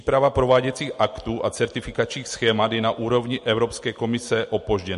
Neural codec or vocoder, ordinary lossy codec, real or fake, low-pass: none; MP3, 48 kbps; real; 14.4 kHz